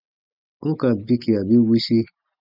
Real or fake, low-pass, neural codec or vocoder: real; 5.4 kHz; none